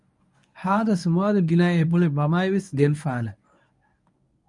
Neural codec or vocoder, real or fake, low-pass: codec, 24 kHz, 0.9 kbps, WavTokenizer, medium speech release version 1; fake; 10.8 kHz